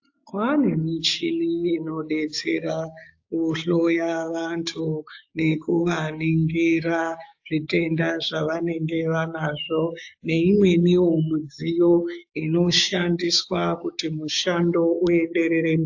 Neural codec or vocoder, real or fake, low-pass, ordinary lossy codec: codec, 16 kHz, 6 kbps, DAC; fake; 7.2 kHz; AAC, 48 kbps